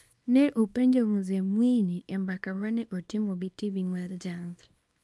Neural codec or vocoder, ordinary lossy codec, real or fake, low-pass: codec, 24 kHz, 0.9 kbps, WavTokenizer, small release; none; fake; none